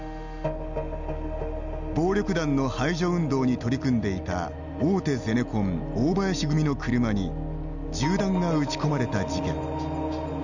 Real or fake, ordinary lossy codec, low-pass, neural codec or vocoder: real; none; 7.2 kHz; none